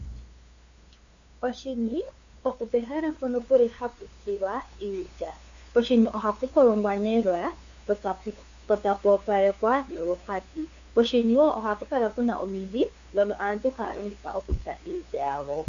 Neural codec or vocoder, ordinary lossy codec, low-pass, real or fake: codec, 16 kHz, 2 kbps, FunCodec, trained on LibriTTS, 25 frames a second; Opus, 64 kbps; 7.2 kHz; fake